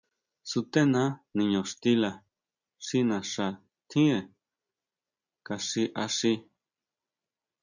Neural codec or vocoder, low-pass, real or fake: none; 7.2 kHz; real